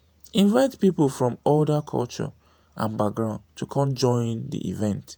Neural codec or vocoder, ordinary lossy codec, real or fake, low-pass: vocoder, 48 kHz, 128 mel bands, Vocos; none; fake; none